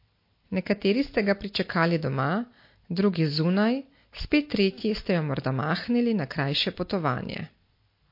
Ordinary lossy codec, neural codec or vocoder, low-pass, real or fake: MP3, 32 kbps; none; 5.4 kHz; real